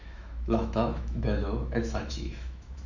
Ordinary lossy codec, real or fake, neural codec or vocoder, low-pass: none; fake; autoencoder, 48 kHz, 128 numbers a frame, DAC-VAE, trained on Japanese speech; 7.2 kHz